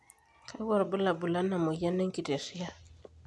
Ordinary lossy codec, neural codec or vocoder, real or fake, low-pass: none; none; real; none